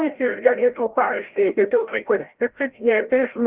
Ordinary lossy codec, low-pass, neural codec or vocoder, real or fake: Opus, 16 kbps; 3.6 kHz; codec, 16 kHz, 0.5 kbps, FreqCodec, larger model; fake